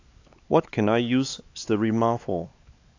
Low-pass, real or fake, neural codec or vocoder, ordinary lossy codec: 7.2 kHz; fake; codec, 16 kHz, 4 kbps, X-Codec, HuBERT features, trained on LibriSpeech; AAC, 48 kbps